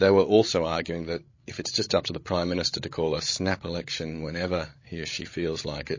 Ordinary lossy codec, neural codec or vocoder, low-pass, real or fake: MP3, 32 kbps; codec, 16 kHz, 16 kbps, FreqCodec, larger model; 7.2 kHz; fake